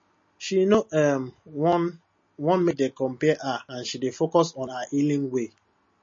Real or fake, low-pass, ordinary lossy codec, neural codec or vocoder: real; 7.2 kHz; MP3, 32 kbps; none